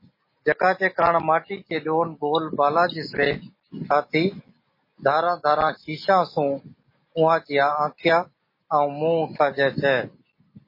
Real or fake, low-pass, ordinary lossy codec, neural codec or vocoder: real; 5.4 kHz; MP3, 24 kbps; none